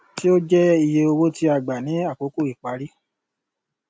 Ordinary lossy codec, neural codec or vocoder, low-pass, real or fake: none; none; none; real